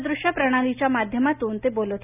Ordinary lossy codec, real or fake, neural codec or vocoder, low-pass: none; real; none; 3.6 kHz